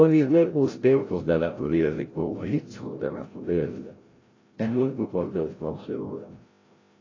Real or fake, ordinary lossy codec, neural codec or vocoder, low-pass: fake; AAC, 32 kbps; codec, 16 kHz, 0.5 kbps, FreqCodec, larger model; 7.2 kHz